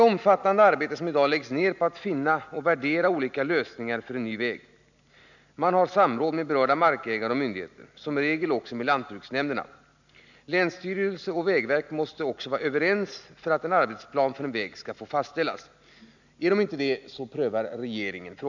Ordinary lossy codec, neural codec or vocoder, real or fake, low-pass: none; none; real; 7.2 kHz